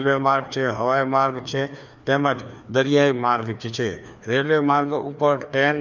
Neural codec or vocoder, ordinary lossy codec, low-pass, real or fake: codec, 16 kHz, 2 kbps, FreqCodec, larger model; none; 7.2 kHz; fake